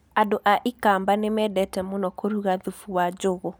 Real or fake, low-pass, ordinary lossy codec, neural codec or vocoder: real; none; none; none